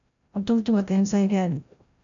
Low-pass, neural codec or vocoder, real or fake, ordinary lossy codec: 7.2 kHz; codec, 16 kHz, 0.5 kbps, FreqCodec, larger model; fake; MP3, 64 kbps